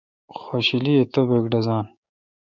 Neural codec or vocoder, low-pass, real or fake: codec, 16 kHz, 6 kbps, DAC; 7.2 kHz; fake